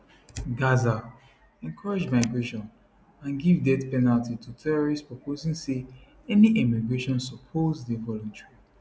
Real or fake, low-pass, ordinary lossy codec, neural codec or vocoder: real; none; none; none